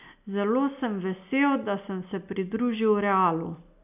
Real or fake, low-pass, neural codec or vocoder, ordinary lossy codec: real; 3.6 kHz; none; none